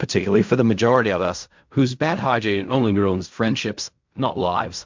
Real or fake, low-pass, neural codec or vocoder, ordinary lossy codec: fake; 7.2 kHz; codec, 16 kHz in and 24 kHz out, 0.4 kbps, LongCat-Audio-Codec, fine tuned four codebook decoder; MP3, 64 kbps